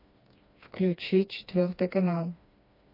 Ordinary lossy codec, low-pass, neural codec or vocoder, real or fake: MP3, 32 kbps; 5.4 kHz; codec, 16 kHz, 2 kbps, FreqCodec, smaller model; fake